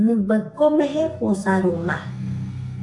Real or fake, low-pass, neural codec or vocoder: fake; 10.8 kHz; codec, 32 kHz, 1.9 kbps, SNAC